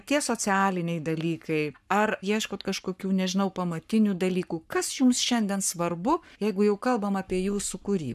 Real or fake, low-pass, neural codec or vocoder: fake; 14.4 kHz; codec, 44.1 kHz, 7.8 kbps, Pupu-Codec